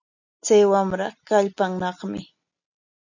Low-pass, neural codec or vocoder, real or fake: 7.2 kHz; none; real